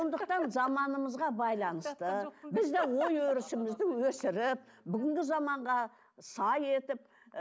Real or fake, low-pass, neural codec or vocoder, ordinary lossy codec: real; none; none; none